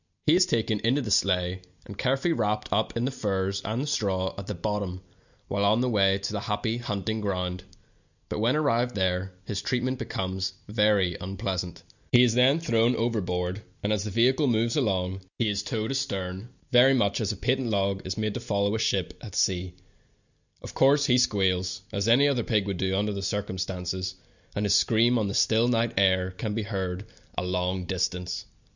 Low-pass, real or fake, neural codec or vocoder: 7.2 kHz; real; none